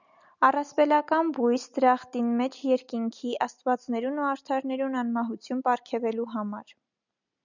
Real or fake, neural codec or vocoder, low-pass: real; none; 7.2 kHz